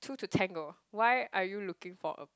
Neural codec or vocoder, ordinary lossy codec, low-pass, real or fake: none; none; none; real